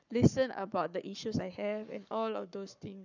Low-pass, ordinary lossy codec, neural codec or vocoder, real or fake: 7.2 kHz; none; codec, 44.1 kHz, 7.8 kbps, Pupu-Codec; fake